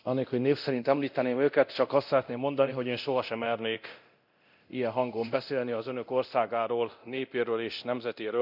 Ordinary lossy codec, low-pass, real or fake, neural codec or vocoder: none; 5.4 kHz; fake; codec, 24 kHz, 0.9 kbps, DualCodec